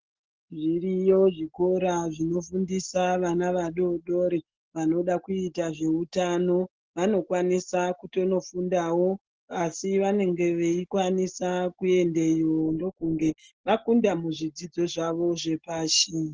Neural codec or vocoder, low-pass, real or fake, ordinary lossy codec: none; 7.2 kHz; real; Opus, 16 kbps